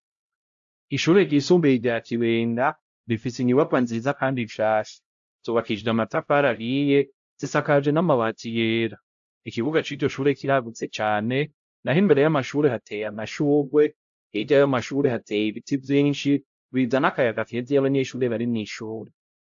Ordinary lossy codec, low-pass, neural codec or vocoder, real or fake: AAC, 64 kbps; 7.2 kHz; codec, 16 kHz, 0.5 kbps, X-Codec, HuBERT features, trained on LibriSpeech; fake